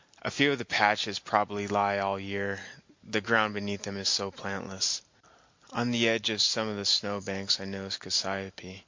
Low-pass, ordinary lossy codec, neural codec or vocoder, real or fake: 7.2 kHz; MP3, 48 kbps; none; real